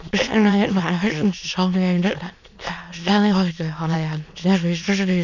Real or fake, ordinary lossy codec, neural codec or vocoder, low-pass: fake; none; autoencoder, 22.05 kHz, a latent of 192 numbers a frame, VITS, trained on many speakers; 7.2 kHz